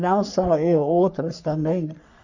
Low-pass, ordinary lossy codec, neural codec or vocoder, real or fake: 7.2 kHz; none; codec, 44.1 kHz, 3.4 kbps, Pupu-Codec; fake